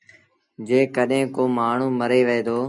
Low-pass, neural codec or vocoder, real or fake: 10.8 kHz; none; real